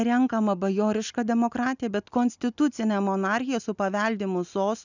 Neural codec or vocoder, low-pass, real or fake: none; 7.2 kHz; real